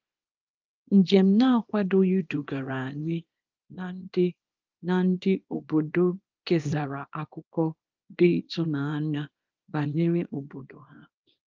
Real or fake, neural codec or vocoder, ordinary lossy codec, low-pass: fake; codec, 24 kHz, 0.9 kbps, WavTokenizer, small release; Opus, 24 kbps; 7.2 kHz